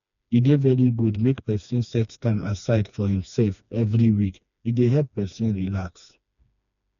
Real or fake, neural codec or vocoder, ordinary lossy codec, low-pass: fake; codec, 16 kHz, 2 kbps, FreqCodec, smaller model; none; 7.2 kHz